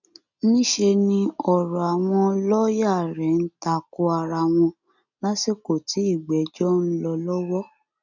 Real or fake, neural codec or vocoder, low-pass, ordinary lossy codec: real; none; 7.2 kHz; none